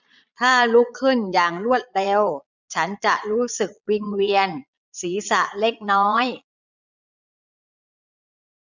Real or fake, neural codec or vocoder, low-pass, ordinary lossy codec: fake; vocoder, 22.05 kHz, 80 mel bands, Vocos; 7.2 kHz; none